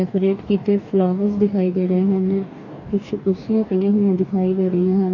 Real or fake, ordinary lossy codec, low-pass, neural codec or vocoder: fake; none; 7.2 kHz; codec, 44.1 kHz, 2.6 kbps, DAC